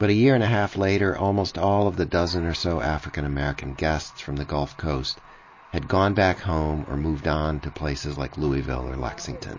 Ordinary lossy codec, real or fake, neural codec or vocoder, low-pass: MP3, 32 kbps; real; none; 7.2 kHz